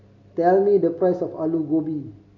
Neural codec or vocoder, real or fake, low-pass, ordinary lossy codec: none; real; 7.2 kHz; none